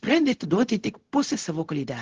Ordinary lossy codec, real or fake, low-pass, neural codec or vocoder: Opus, 16 kbps; fake; 7.2 kHz; codec, 16 kHz, 0.4 kbps, LongCat-Audio-Codec